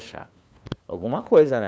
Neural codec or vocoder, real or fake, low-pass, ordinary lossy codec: codec, 16 kHz, 2 kbps, FunCodec, trained on LibriTTS, 25 frames a second; fake; none; none